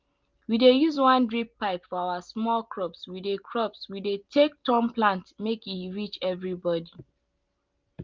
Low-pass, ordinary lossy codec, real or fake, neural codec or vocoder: 7.2 kHz; Opus, 32 kbps; real; none